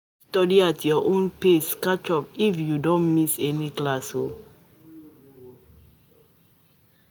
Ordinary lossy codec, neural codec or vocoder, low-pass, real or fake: none; none; none; real